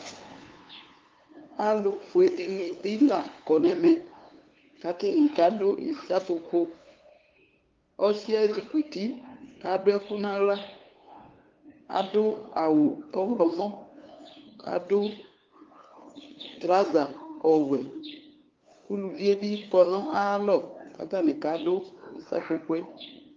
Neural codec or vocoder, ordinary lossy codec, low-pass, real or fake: codec, 16 kHz, 2 kbps, FunCodec, trained on LibriTTS, 25 frames a second; Opus, 32 kbps; 7.2 kHz; fake